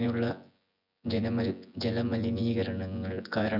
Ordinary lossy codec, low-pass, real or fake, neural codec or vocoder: none; 5.4 kHz; fake; vocoder, 24 kHz, 100 mel bands, Vocos